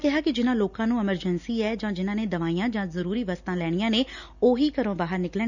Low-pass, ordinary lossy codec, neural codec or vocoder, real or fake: 7.2 kHz; none; none; real